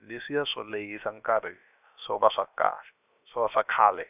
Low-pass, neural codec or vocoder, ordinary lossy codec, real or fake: 3.6 kHz; codec, 16 kHz, about 1 kbps, DyCAST, with the encoder's durations; none; fake